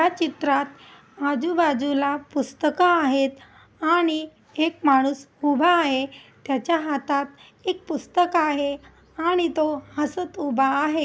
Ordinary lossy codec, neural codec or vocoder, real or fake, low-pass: none; none; real; none